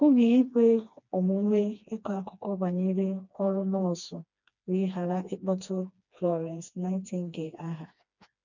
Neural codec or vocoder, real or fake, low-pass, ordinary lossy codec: codec, 16 kHz, 2 kbps, FreqCodec, smaller model; fake; 7.2 kHz; none